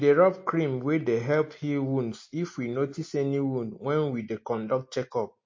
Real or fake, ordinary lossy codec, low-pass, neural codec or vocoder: real; MP3, 32 kbps; 7.2 kHz; none